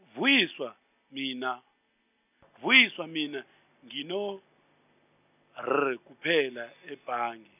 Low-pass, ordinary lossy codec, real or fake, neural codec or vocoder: 3.6 kHz; none; real; none